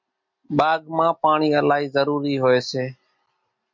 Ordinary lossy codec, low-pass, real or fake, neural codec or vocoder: MP3, 48 kbps; 7.2 kHz; real; none